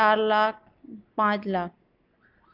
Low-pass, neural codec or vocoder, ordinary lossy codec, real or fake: 5.4 kHz; none; none; real